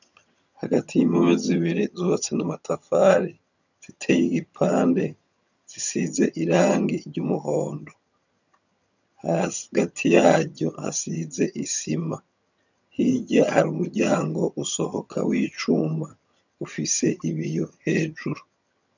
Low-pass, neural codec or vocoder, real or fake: 7.2 kHz; vocoder, 22.05 kHz, 80 mel bands, HiFi-GAN; fake